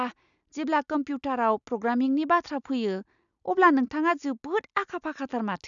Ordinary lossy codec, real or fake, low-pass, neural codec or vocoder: none; real; 7.2 kHz; none